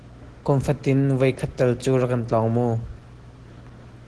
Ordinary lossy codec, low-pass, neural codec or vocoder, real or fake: Opus, 16 kbps; 10.8 kHz; none; real